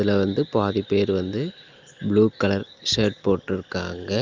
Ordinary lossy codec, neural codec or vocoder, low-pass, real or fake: Opus, 24 kbps; none; 7.2 kHz; real